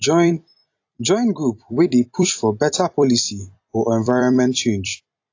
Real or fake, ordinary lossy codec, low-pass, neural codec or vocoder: fake; AAC, 48 kbps; 7.2 kHz; vocoder, 44.1 kHz, 128 mel bands every 256 samples, BigVGAN v2